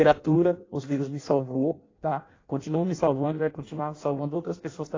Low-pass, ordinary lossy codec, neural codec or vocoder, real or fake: 7.2 kHz; AAC, 32 kbps; codec, 16 kHz in and 24 kHz out, 0.6 kbps, FireRedTTS-2 codec; fake